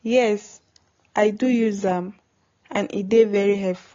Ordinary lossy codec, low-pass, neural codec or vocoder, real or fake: AAC, 32 kbps; 7.2 kHz; none; real